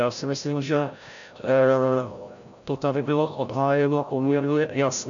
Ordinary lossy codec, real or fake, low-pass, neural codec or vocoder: MP3, 96 kbps; fake; 7.2 kHz; codec, 16 kHz, 0.5 kbps, FreqCodec, larger model